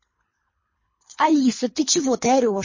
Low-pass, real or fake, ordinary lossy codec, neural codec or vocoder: 7.2 kHz; fake; MP3, 32 kbps; codec, 24 kHz, 3 kbps, HILCodec